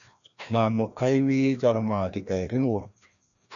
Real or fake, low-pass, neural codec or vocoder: fake; 7.2 kHz; codec, 16 kHz, 1 kbps, FreqCodec, larger model